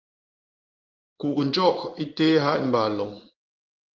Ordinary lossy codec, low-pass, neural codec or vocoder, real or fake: Opus, 32 kbps; 7.2 kHz; codec, 16 kHz in and 24 kHz out, 1 kbps, XY-Tokenizer; fake